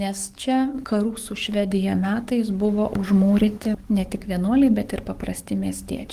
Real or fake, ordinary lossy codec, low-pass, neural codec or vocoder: fake; Opus, 24 kbps; 14.4 kHz; codec, 44.1 kHz, 7.8 kbps, DAC